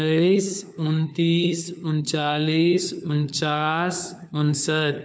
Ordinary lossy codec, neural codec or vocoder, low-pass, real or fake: none; codec, 16 kHz, 4 kbps, FunCodec, trained on LibriTTS, 50 frames a second; none; fake